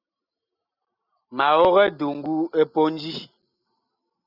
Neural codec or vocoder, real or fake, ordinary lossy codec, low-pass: none; real; Opus, 64 kbps; 5.4 kHz